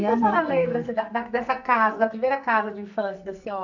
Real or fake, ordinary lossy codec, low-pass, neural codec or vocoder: fake; none; 7.2 kHz; codec, 44.1 kHz, 2.6 kbps, SNAC